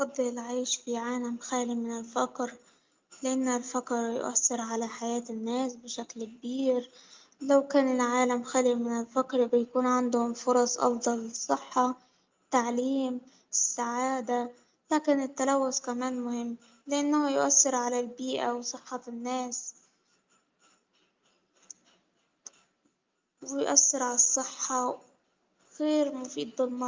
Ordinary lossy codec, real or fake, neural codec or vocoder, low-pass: Opus, 24 kbps; real; none; 7.2 kHz